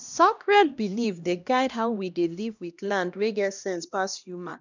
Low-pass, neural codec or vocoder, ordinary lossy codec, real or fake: 7.2 kHz; codec, 16 kHz, 1 kbps, X-Codec, HuBERT features, trained on LibriSpeech; none; fake